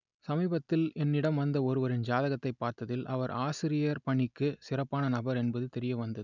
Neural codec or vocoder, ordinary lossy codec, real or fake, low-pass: none; none; real; 7.2 kHz